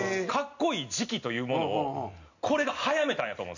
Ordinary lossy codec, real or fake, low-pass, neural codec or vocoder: none; real; 7.2 kHz; none